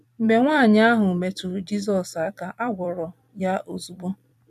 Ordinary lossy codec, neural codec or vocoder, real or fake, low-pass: none; vocoder, 44.1 kHz, 128 mel bands every 256 samples, BigVGAN v2; fake; 14.4 kHz